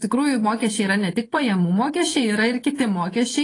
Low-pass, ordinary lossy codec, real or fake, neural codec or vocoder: 10.8 kHz; AAC, 32 kbps; fake; vocoder, 24 kHz, 100 mel bands, Vocos